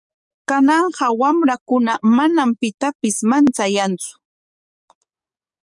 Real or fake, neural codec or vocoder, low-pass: fake; codec, 44.1 kHz, 7.8 kbps, DAC; 10.8 kHz